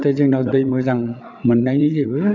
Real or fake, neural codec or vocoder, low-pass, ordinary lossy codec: fake; vocoder, 22.05 kHz, 80 mel bands, WaveNeXt; 7.2 kHz; none